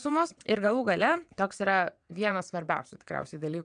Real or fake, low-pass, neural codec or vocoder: fake; 9.9 kHz; vocoder, 22.05 kHz, 80 mel bands, WaveNeXt